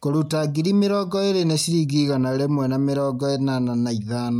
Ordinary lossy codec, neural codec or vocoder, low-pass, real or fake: MP3, 96 kbps; none; 19.8 kHz; real